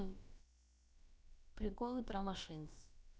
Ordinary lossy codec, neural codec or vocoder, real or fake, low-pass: none; codec, 16 kHz, about 1 kbps, DyCAST, with the encoder's durations; fake; none